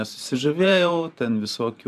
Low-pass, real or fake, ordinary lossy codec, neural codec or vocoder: 14.4 kHz; fake; AAC, 96 kbps; vocoder, 44.1 kHz, 128 mel bands every 512 samples, BigVGAN v2